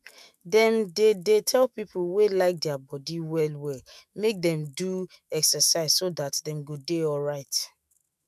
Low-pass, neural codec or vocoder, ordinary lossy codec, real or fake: 14.4 kHz; autoencoder, 48 kHz, 128 numbers a frame, DAC-VAE, trained on Japanese speech; none; fake